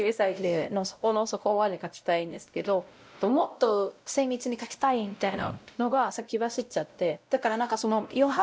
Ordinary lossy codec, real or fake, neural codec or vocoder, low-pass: none; fake; codec, 16 kHz, 0.5 kbps, X-Codec, WavLM features, trained on Multilingual LibriSpeech; none